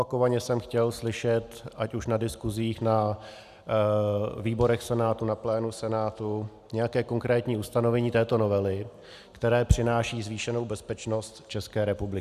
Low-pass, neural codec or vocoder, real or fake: 14.4 kHz; none; real